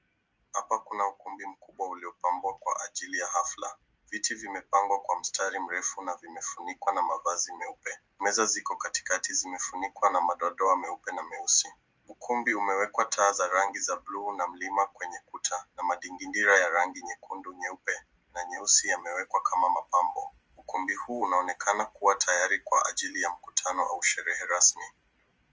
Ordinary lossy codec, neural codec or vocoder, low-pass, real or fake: Opus, 24 kbps; none; 7.2 kHz; real